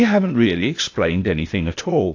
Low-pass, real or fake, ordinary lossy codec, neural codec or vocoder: 7.2 kHz; fake; AAC, 48 kbps; codec, 16 kHz, 0.8 kbps, ZipCodec